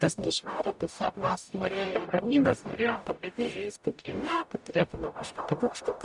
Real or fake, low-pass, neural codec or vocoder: fake; 10.8 kHz; codec, 44.1 kHz, 0.9 kbps, DAC